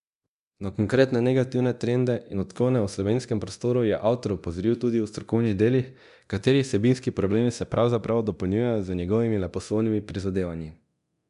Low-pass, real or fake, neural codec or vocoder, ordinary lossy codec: 10.8 kHz; fake; codec, 24 kHz, 0.9 kbps, DualCodec; none